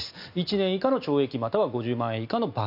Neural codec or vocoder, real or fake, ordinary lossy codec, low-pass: none; real; none; 5.4 kHz